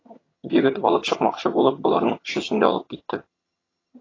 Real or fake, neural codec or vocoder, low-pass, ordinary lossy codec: fake; vocoder, 22.05 kHz, 80 mel bands, HiFi-GAN; 7.2 kHz; AAC, 32 kbps